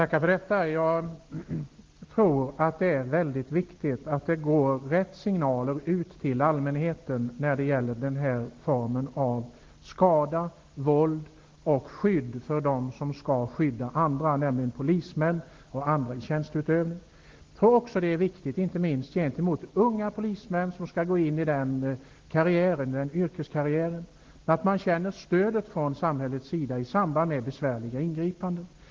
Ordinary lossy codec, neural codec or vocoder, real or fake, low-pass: Opus, 16 kbps; none; real; 7.2 kHz